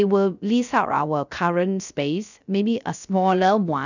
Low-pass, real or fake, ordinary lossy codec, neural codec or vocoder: 7.2 kHz; fake; none; codec, 16 kHz, 0.3 kbps, FocalCodec